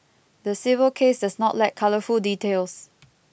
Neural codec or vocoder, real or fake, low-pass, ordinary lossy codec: none; real; none; none